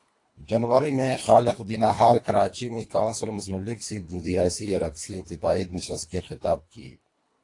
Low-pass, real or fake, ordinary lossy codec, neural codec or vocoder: 10.8 kHz; fake; AAC, 48 kbps; codec, 24 kHz, 1.5 kbps, HILCodec